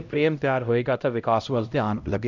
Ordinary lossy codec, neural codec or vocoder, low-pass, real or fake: none; codec, 16 kHz, 0.5 kbps, X-Codec, HuBERT features, trained on LibriSpeech; 7.2 kHz; fake